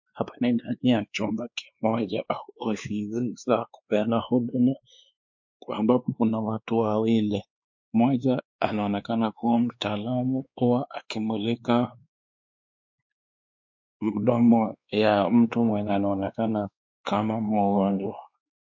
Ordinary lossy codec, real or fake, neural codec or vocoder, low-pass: MP3, 48 kbps; fake; codec, 16 kHz, 2 kbps, X-Codec, WavLM features, trained on Multilingual LibriSpeech; 7.2 kHz